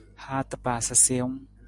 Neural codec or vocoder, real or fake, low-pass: none; real; 10.8 kHz